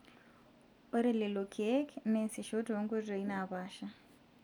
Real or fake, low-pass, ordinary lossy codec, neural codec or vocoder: real; 19.8 kHz; none; none